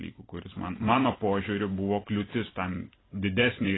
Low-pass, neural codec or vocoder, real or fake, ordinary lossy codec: 7.2 kHz; vocoder, 44.1 kHz, 128 mel bands every 256 samples, BigVGAN v2; fake; AAC, 16 kbps